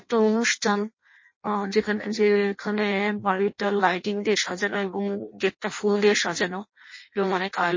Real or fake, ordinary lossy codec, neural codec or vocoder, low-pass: fake; MP3, 32 kbps; codec, 16 kHz in and 24 kHz out, 0.6 kbps, FireRedTTS-2 codec; 7.2 kHz